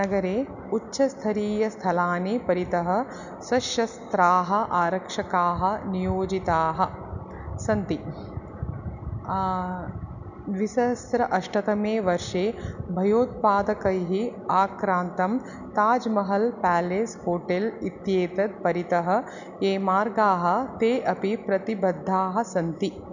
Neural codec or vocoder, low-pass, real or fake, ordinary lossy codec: none; 7.2 kHz; real; none